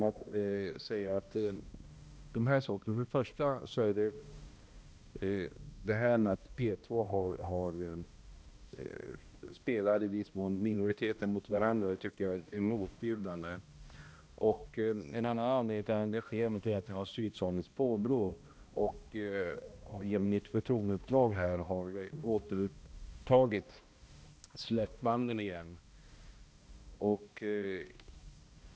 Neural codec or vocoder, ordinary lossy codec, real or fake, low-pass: codec, 16 kHz, 1 kbps, X-Codec, HuBERT features, trained on balanced general audio; none; fake; none